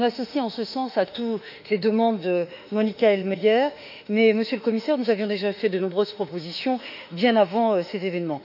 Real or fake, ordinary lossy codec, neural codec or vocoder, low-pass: fake; none; autoencoder, 48 kHz, 32 numbers a frame, DAC-VAE, trained on Japanese speech; 5.4 kHz